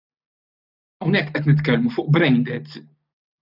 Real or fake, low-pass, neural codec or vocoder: real; 5.4 kHz; none